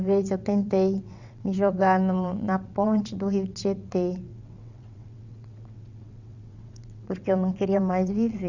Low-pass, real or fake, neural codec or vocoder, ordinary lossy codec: 7.2 kHz; fake; vocoder, 22.05 kHz, 80 mel bands, WaveNeXt; none